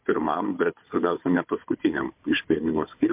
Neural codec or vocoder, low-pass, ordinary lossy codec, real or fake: codec, 16 kHz, 4 kbps, FunCodec, trained on Chinese and English, 50 frames a second; 3.6 kHz; MP3, 32 kbps; fake